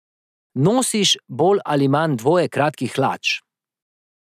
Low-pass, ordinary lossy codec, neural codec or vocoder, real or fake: 14.4 kHz; none; none; real